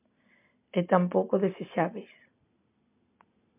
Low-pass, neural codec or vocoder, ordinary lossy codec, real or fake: 3.6 kHz; none; MP3, 32 kbps; real